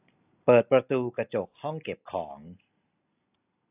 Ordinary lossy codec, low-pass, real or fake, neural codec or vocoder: AAC, 24 kbps; 3.6 kHz; real; none